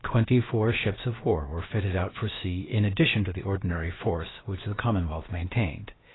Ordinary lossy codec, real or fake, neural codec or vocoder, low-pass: AAC, 16 kbps; fake; codec, 16 kHz, about 1 kbps, DyCAST, with the encoder's durations; 7.2 kHz